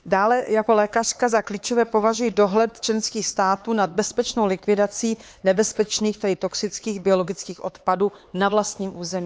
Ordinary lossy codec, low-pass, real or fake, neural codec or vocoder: none; none; fake; codec, 16 kHz, 4 kbps, X-Codec, HuBERT features, trained on LibriSpeech